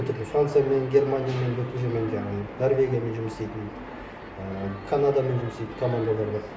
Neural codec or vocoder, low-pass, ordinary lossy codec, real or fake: none; none; none; real